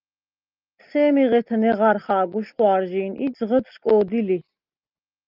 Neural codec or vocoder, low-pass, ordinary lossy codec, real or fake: none; 5.4 kHz; Opus, 24 kbps; real